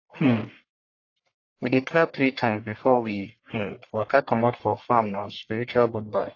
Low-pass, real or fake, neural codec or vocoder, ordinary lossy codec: 7.2 kHz; fake; codec, 44.1 kHz, 1.7 kbps, Pupu-Codec; AAC, 32 kbps